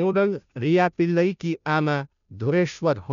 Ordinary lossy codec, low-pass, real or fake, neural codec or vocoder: none; 7.2 kHz; fake; codec, 16 kHz, 0.5 kbps, FunCodec, trained on Chinese and English, 25 frames a second